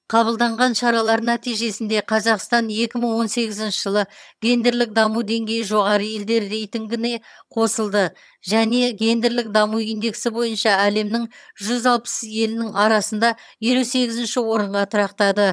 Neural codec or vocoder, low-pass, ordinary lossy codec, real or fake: vocoder, 22.05 kHz, 80 mel bands, HiFi-GAN; none; none; fake